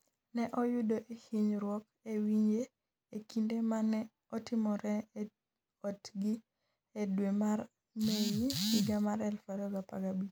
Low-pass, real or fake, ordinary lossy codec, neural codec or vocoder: none; real; none; none